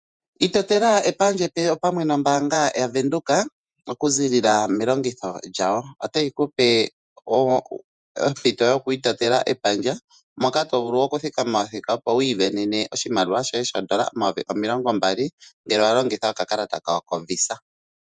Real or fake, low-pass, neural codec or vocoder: fake; 9.9 kHz; vocoder, 48 kHz, 128 mel bands, Vocos